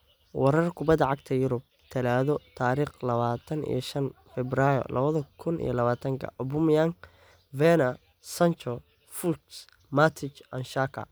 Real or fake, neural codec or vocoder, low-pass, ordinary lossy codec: real; none; none; none